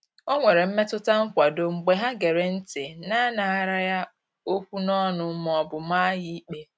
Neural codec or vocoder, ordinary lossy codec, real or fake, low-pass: none; none; real; none